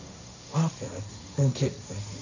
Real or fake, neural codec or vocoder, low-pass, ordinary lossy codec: fake; codec, 16 kHz, 1.1 kbps, Voila-Tokenizer; 7.2 kHz; none